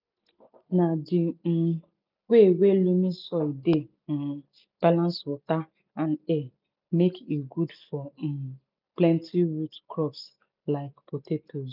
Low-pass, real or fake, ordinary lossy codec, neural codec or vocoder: 5.4 kHz; real; none; none